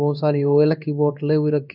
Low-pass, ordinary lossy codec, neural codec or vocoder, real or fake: 5.4 kHz; none; codec, 16 kHz in and 24 kHz out, 1 kbps, XY-Tokenizer; fake